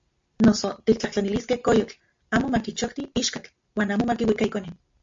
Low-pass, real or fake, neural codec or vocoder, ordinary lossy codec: 7.2 kHz; real; none; MP3, 48 kbps